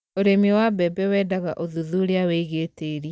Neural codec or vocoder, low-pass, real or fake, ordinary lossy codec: none; none; real; none